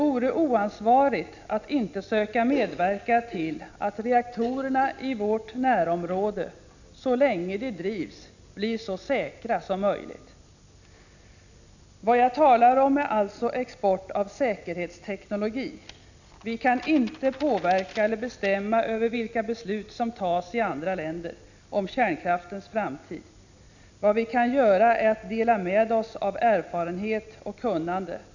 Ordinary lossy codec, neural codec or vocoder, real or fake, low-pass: none; none; real; 7.2 kHz